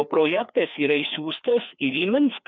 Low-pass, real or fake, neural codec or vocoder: 7.2 kHz; fake; codec, 16 kHz, 2 kbps, FreqCodec, larger model